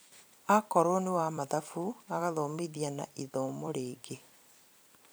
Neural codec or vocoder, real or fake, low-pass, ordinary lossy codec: vocoder, 44.1 kHz, 128 mel bands every 256 samples, BigVGAN v2; fake; none; none